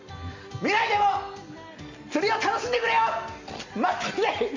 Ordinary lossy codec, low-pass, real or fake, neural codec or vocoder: MP3, 48 kbps; 7.2 kHz; real; none